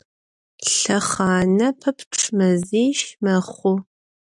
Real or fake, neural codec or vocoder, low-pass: real; none; 10.8 kHz